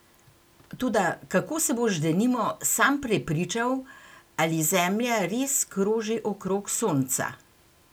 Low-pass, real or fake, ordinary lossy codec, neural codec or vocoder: none; real; none; none